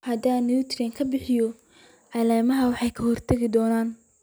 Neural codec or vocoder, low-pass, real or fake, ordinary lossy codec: none; none; real; none